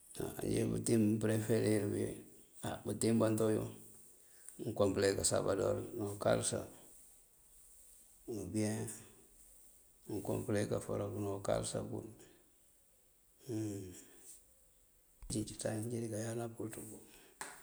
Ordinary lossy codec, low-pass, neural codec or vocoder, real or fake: none; none; none; real